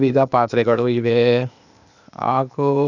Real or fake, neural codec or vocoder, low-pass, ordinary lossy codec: fake; codec, 16 kHz, 0.8 kbps, ZipCodec; 7.2 kHz; none